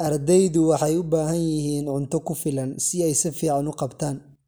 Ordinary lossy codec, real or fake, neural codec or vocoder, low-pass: none; real; none; none